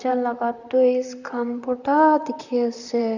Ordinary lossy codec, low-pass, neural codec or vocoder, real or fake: none; 7.2 kHz; vocoder, 44.1 kHz, 128 mel bands, Pupu-Vocoder; fake